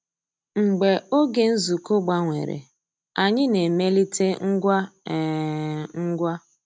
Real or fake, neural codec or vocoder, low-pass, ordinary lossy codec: real; none; none; none